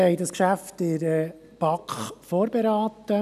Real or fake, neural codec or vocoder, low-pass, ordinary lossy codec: real; none; 14.4 kHz; none